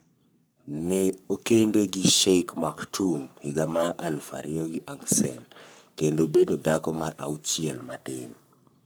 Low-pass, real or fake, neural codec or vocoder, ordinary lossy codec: none; fake; codec, 44.1 kHz, 3.4 kbps, Pupu-Codec; none